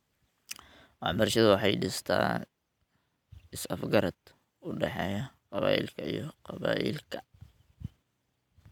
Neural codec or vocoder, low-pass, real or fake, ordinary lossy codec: none; 19.8 kHz; real; none